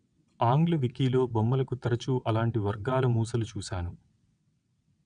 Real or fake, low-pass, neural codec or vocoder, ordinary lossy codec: fake; 9.9 kHz; vocoder, 22.05 kHz, 80 mel bands, WaveNeXt; none